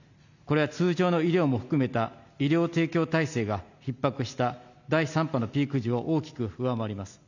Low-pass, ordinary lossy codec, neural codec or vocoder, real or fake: 7.2 kHz; none; none; real